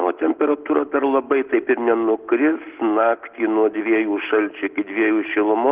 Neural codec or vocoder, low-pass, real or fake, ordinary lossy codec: none; 3.6 kHz; real; Opus, 16 kbps